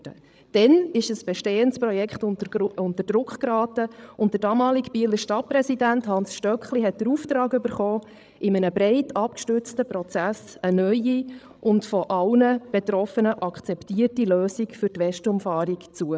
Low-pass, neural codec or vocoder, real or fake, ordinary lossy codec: none; codec, 16 kHz, 16 kbps, FreqCodec, larger model; fake; none